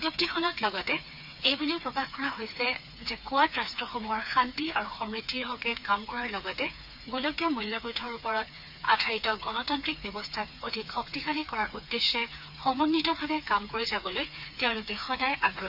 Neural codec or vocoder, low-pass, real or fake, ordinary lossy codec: codec, 16 kHz, 4 kbps, FreqCodec, smaller model; 5.4 kHz; fake; none